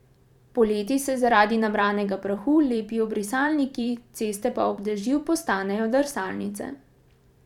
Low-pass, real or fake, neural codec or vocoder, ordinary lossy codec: 19.8 kHz; fake; vocoder, 44.1 kHz, 128 mel bands every 512 samples, BigVGAN v2; none